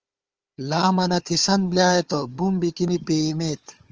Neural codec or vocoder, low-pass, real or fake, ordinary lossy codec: codec, 16 kHz, 4 kbps, FunCodec, trained on Chinese and English, 50 frames a second; 7.2 kHz; fake; Opus, 24 kbps